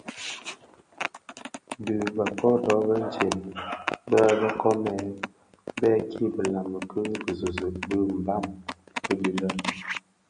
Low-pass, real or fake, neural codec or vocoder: 9.9 kHz; real; none